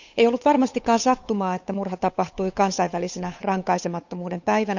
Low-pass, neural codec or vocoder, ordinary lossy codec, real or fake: 7.2 kHz; codec, 16 kHz, 8 kbps, FunCodec, trained on Chinese and English, 25 frames a second; none; fake